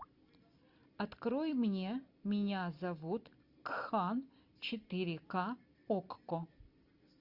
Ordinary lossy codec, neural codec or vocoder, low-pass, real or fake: Opus, 64 kbps; none; 5.4 kHz; real